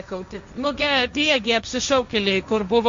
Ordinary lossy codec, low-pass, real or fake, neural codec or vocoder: MP3, 48 kbps; 7.2 kHz; fake; codec, 16 kHz, 1.1 kbps, Voila-Tokenizer